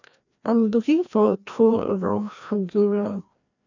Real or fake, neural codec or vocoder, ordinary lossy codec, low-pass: fake; codec, 16 kHz, 1 kbps, FreqCodec, larger model; none; 7.2 kHz